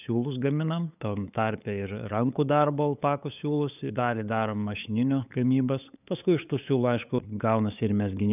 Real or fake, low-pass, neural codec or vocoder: fake; 3.6 kHz; codec, 16 kHz, 8 kbps, FunCodec, trained on LibriTTS, 25 frames a second